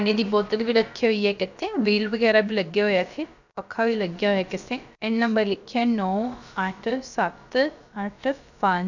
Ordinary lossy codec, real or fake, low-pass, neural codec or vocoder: none; fake; 7.2 kHz; codec, 16 kHz, about 1 kbps, DyCAST, with the encoder's durations